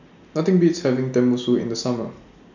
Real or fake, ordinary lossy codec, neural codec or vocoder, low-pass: real; none; none; 7.2 kHz